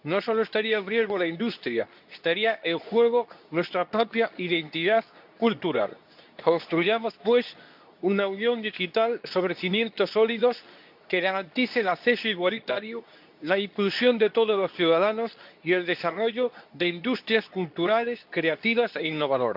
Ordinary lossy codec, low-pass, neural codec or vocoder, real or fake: none; 5.4 kHz; codec, 24 kHz, 0.9 kbps, WavTokenizer, medium speech release version 2; fake